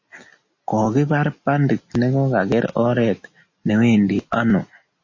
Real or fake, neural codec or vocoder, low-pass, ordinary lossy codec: real; none; 7.2 kHz; MP3, 32 kbps